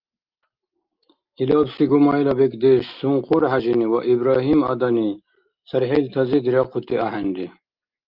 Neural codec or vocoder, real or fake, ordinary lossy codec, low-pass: none; real; Opus, 24 kbps; 5.4 kHz